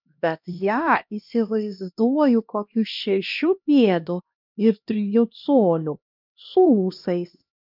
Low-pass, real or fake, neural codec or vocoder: 5.4 kHz; fake; codec, 16 kHz, 1 kbps, X-Codec, HuBERT features, trained on LibriSpeech